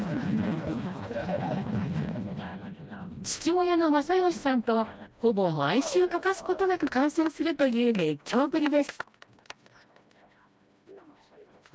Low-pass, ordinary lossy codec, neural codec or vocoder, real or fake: none; none; codec, 16 kHz, 1 kbps, FreqCodec, smaller model; fake